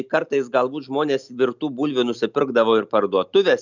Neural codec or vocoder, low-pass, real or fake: none; 7.2 kHz; real